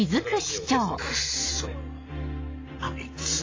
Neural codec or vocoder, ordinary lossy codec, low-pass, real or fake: none; AAC, 32 kbps; 7.2 kHz; real